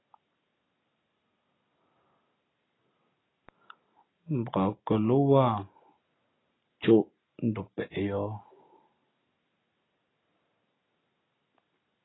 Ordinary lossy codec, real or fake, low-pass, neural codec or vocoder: AAC, 16 kbps; real; 7.2 kHz; none